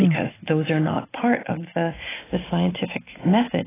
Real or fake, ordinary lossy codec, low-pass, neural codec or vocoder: real; AAC, 16 kbps; 3.6 kHz; none